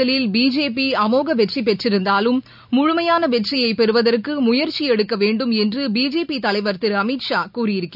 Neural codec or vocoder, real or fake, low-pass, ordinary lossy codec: none; real; 5.4 kHz; none